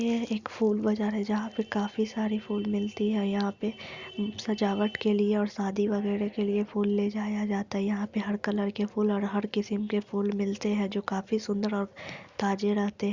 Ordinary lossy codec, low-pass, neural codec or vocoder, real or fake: Opus, 64 kbps; 7.2 kHz; none; real